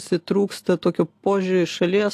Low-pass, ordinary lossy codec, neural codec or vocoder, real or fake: 14.4 kHz; AAC, 64 kbps; none; real